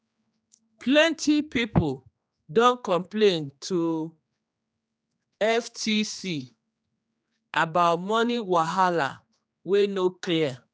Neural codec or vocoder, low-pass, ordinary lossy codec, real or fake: codec, 16 kHz, 2 kbps, X-Codec, HuBERT features, trained on general audio; none; none; fake